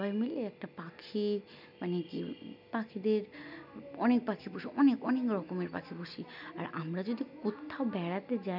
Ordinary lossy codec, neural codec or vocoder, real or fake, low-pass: none; none; real; 5.4 kHz